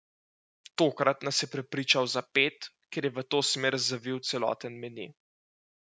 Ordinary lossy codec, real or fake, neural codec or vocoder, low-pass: none; real; none; none